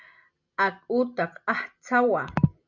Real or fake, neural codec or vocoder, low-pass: real; none; 7.2 kHz